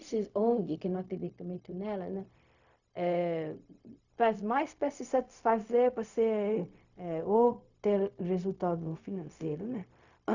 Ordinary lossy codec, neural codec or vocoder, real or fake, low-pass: none; codec, 16 kHz, 0.4 kbps, LongCat-Audio-Codec; fake; 7.2 kHz